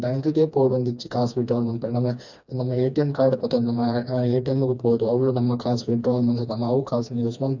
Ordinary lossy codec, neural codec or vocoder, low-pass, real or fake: none; codec, 16 kHz, 2 kbps, FreqCodec, smaller model; 7.2 kHz; fake